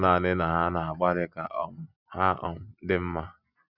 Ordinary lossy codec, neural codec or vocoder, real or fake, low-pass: none; none; real; 5.4 kHz